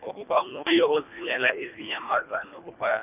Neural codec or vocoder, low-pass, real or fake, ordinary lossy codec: codec, 24 kHz, 1.5 kbps, HILCodec; 3.6 kHz; fake; none